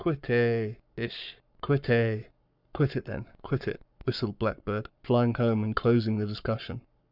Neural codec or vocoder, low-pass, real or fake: codec, 44.1 kHz, 7.8 kbps, Pupu-Codec; 5.4 kHz; fake